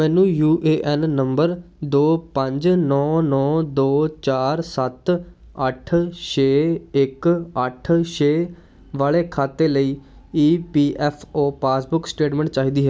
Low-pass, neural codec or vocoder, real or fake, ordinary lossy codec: none; none; real; none